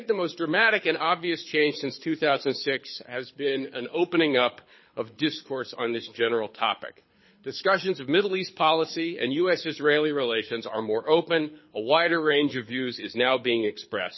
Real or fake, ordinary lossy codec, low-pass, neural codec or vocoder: fake; MP3, 24 kbps; 7.2 kHz; codec, 24 kHz, 6 kbps, HILCodec